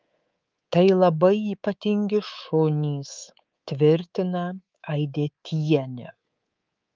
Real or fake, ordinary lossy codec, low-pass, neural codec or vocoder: fake; Opus, 24 kbps; 7.2 kHz; codec, 24 kHz, 3.1 kbps, DualCodec